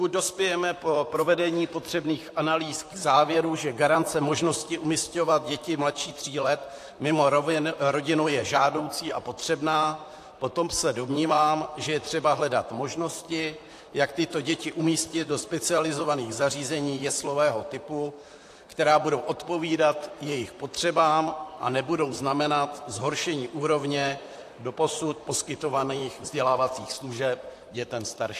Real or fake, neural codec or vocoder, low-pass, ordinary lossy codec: fake; vocoder, 44.1 kHz, 128 mel bands, Pupu-Vocoder; 14.4 kHz; AAC, 64 kbps